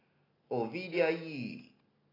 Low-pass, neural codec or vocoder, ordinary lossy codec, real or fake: 5.4 kHz; none; AAC, 24 kbps; real